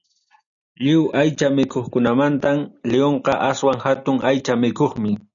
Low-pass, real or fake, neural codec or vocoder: 7.2 kHz; real; none